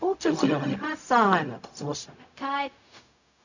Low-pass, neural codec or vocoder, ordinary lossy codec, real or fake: 7.2 kHz; codec, 16 kHz, 0.4 kbps, LongCat-Audio-Codec; none; fake